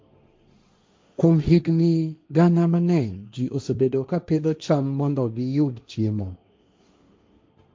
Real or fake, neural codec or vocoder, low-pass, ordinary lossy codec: fake; codec, 16 kHz, 1.1 kbps, Voila-Tokenizer; none; none